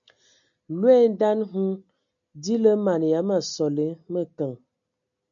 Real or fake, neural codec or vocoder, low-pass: real; none; 7.2 kHz